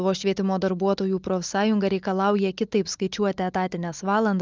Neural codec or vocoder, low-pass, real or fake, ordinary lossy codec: none; 7.2 kHz; real; Opus, 24 kbps